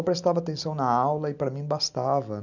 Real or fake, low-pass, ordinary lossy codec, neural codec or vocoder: real; 7.2 kHz; none; none